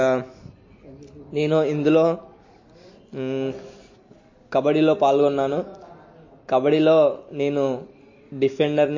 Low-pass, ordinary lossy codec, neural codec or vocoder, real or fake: 7.2 kHz; MP3, 32 kbps; none; real